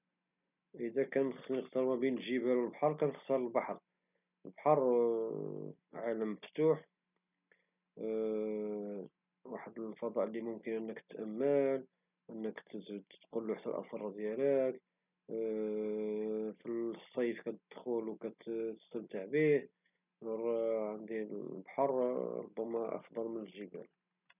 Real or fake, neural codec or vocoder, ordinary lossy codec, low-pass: real; none; none; 3.6 kHz